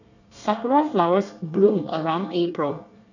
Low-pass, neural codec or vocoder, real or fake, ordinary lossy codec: 7.2 kHz; codec, 24 kHz, 1 kbps, SNAC; fake; none